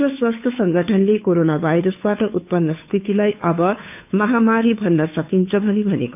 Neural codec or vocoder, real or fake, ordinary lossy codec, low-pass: codec, 16 kHz, 2 kbps, FunCodec, trained on Chinese and English, 25 frames a second; fake; none; 3.6 kHz